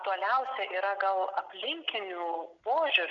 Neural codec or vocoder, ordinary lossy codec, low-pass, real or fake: none; MP3, 64 kbps; 7.2 kHz; real